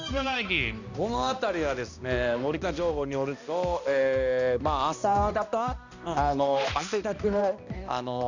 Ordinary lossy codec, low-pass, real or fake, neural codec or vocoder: none; 7.2 kHz; fake; codec, 16 kHz, 1 kbps, X-Codec, HuBERT features, trained on balanced general audio